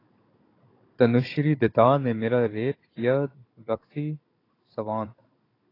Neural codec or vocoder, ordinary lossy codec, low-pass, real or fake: codec, 16 kHz, 16 kbps, FunCodec, trained on Chinese and English, 50 frames a second; AAC, 24 kbps; 5.4 kHz; fake